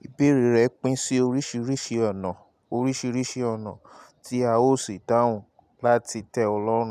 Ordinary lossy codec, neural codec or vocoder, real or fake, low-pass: none; none; real; 14.4 kHz